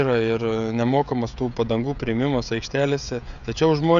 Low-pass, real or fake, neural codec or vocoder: 7.2 kHz; fake; codec, 16 kHz, 16 kbps, FreqCodec, smaller model